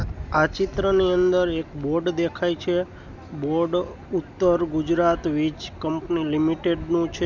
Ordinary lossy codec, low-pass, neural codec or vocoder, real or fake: none; 7.2 kHz; none; real